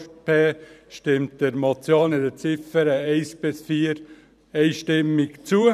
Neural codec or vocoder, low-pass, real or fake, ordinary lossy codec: vocoder, 44.1 kHz, 128 mel bands every 512 samples, BigVGAN v2; 14.4 kHz; fake; none